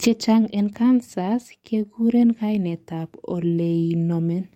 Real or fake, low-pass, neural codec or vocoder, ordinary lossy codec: fake; 19.8 kHz; codec, 44.1 kHz, 7.8 kbps, DAC; MP3, 64 kbps